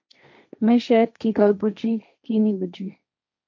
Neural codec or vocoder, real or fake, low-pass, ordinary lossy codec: codec, 16 kHz, 1.1 kbps, Voila-Tokenizer; fake; 7.2 kHz; MP3, 48 kbps